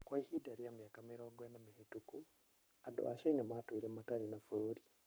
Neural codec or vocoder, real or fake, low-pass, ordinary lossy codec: codec, 44.1 kHz, 7.8 kbps, Pupu-Codec; fake; none; none